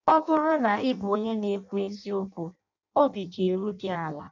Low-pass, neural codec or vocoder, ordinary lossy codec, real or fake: 7.2 kHz; codec, 16 kHz in and 24 kHz out, 0.6 kbps, FireRedTTS-2 codec; none; fake